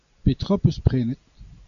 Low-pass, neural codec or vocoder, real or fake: 7.2 kHz; none; real